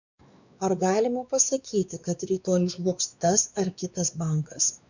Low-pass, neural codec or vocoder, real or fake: 7.2 kHz; codec, 16 kHz, 2 kbps, X-Codec, WavLM features, trained on Multilingual LibriSpeech; fake